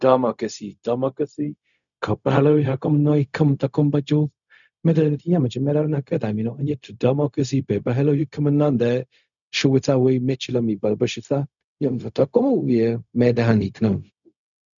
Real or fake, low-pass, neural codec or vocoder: fake; 7.2 kHz; codec, 16 kHz, 0.4 kbps, LongCat-Audio-Codec